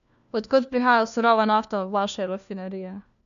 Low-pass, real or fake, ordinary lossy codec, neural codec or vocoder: 7.2 kHz; fake; MP3, 96 kbps; codec, 16 kHz, 1 kbps, FunCodec, trained on LibriTTS, 50 frames a second